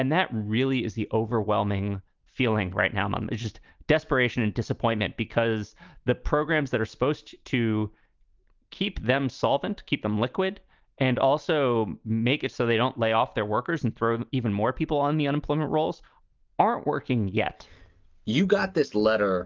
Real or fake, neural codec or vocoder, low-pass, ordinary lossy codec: real; none; 7.2 kHz; Opus, 32 kbps